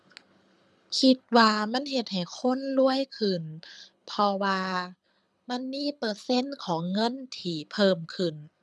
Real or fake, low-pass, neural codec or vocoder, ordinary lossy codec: fake; none; codec, 24 kHz, 6 kbps, HILCodec; none